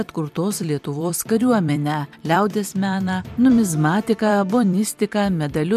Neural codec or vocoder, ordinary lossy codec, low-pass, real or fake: vocoder, 44.1 kHz, 128 mel bands every 256 samples, BigVGAN v2; MP3, 96 kbps; 14.4 kHz; fake